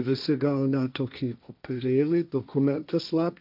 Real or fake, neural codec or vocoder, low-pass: fake; codec, 16 kHz, 1.1 kbps, Voila-Tokenizer; 5.4 kHz